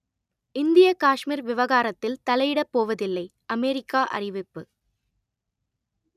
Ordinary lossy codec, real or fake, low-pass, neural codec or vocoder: none; real; 14.4 kHz; none